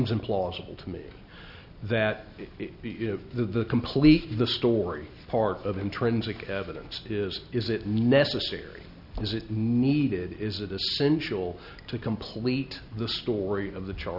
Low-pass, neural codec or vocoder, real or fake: 5.4 kHz; none; real